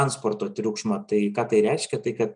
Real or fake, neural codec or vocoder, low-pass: real; none; 9.9 kHz